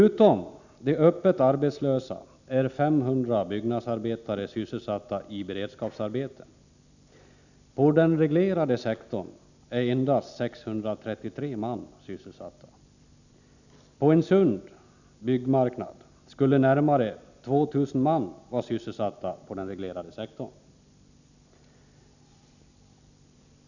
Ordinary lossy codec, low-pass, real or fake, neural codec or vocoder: none; 7.2 kHz; real; none